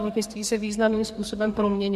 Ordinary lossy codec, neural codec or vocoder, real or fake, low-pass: MP3, 64 kbps; codec, 44.1 kHz, 2.6 kbps, SNAC; fake; 14.4 kHz